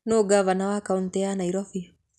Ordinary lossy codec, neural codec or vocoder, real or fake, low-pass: none; none; real; none